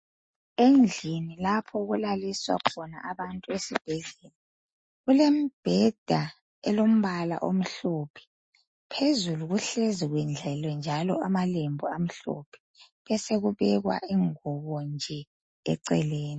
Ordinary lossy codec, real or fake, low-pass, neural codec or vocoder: MP3, 32 kbps; real; 9.9 kHz; none